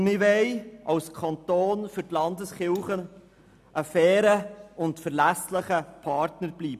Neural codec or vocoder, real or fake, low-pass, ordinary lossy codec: none; real; 14.4 kHz; none